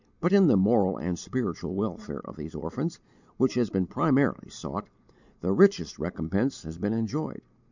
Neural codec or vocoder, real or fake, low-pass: none; real; 7.2 kHz